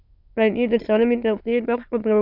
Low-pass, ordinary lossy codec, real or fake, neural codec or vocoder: 5.4 kHz; AAC, 48 kbps; fake; autoencoder, 22.05 kHz, a latent of 192 numbers a frame, VITS, trained on many speakers